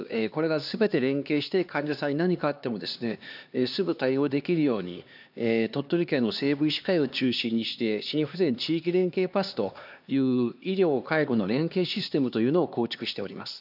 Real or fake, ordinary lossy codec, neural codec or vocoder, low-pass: fake; none; codec, 16 kHz, 2 kbps, X-Codec, HuBERT features, trained on LibriSpeech; 5.4 kHz